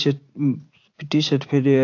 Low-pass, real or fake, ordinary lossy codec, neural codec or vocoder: 7.2 kHz; fake; AAC, 48 kbps; vocoder, 44.1 kHz, 80 mel bands, Vocos